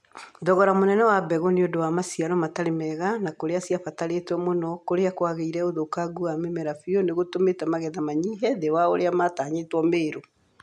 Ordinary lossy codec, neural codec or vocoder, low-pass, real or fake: none; none; none; real